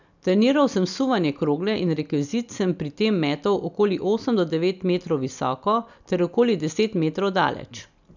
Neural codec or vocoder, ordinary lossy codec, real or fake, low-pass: none; none; real; 7.2 kHz